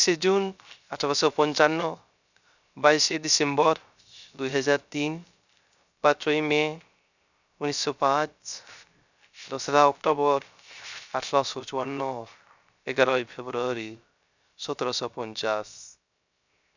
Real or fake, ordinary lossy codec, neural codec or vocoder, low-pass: fake; none; codec, 16 kHz, 0.3 kbps, FocalCodec; 7.2 kHz